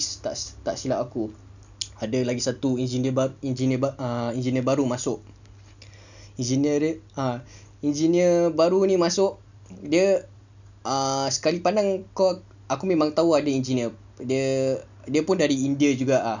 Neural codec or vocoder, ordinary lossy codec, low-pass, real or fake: none; none; 7.2 kHz; real